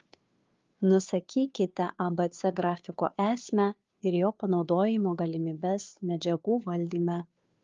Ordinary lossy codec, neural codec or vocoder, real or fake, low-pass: Opus, 32 kbps; codec, 16 kHz, 2 kbps, FunCodec, trained on Chinese and English, 25 frames a second; fake; 7.2 kHz